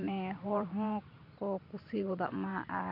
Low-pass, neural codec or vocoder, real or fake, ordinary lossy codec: 5.4 kHz; vocoder, 44.1 kHz, 128 mel bands every 512 samples, BigVGAN v2; fake; MP3, 48 kbps